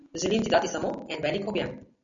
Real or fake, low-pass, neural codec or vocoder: real; 7.2 kHz; none